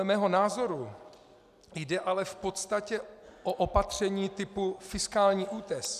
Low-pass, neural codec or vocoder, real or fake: 14.4 kHz; none; real